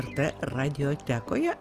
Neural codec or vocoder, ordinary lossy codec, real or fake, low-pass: none; Opus, 24 kbps; real; 14.4 kHz